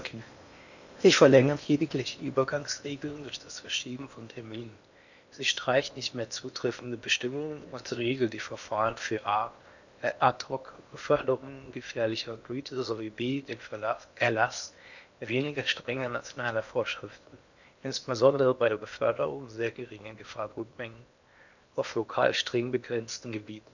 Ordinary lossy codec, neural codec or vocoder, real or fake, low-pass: none; codec, 16 kHz in and 24 kHz out, 0.8 kbps, FocalCodec, streaming, 65536 codes; fake; 7.2 kHz